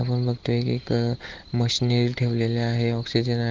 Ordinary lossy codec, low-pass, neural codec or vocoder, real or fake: Opus, 16 kbps; 7.2 kHz; none; real